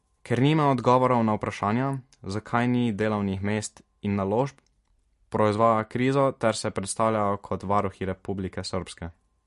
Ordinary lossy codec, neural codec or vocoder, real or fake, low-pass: MP3, 48 kbps; none; real; 14.4 kHz